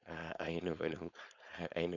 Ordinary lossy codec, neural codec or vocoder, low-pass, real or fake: none; codec, 16 kHz, 4.8 kbps, FACodec; 7.2 kHz; fake